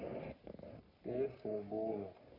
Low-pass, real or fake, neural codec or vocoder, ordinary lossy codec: 5.4 kHz; fake; codec, 44.1 kHz, 3.4 kbps, Pupu-Codec; none